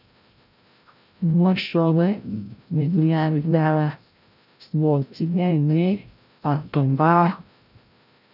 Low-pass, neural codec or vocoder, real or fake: 5.4 kHz; codec, 16 kHz, 0.5 kbps, FreqCodec, larger model; fake